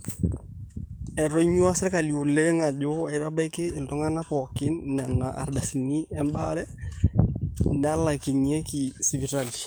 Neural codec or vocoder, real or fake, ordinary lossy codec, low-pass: codec, 44.1 kHz, 7.8 kbps, DAC; fake; none; none